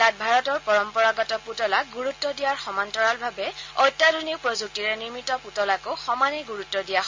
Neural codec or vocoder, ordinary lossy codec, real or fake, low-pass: none; MP3, 48 kbps; real; 7.2 kHz